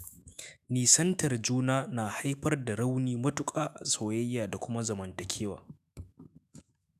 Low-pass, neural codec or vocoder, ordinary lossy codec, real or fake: 14.4 kHz; autoencoder, 48 kHz, 128 numbers a frame, DAC-VAE, trained on Japanese speech; none; fake